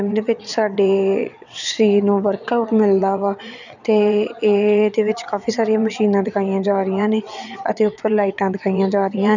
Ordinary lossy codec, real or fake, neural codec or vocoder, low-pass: none; fake; vocoder, 22.05 kHz, 80 mel bands, WaveNeXt; 7.2 kHz